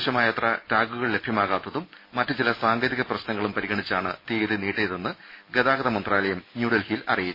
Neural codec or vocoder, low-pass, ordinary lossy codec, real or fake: none; 5.4 kHz; MP3, 24 kbps; real